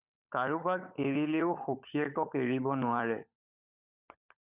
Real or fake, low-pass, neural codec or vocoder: fake; 3.6 kHz; codec, 16 kHz, 16 kbps, FunCodec, trained on LibriTTS, 50 frames a second